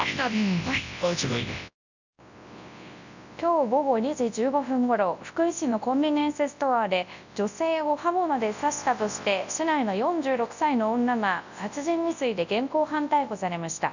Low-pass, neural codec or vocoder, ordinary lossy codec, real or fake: 7.2 kHz; codec, 24 kHz, 0.9 kbps, WavTokenizer, large speech release; none; fake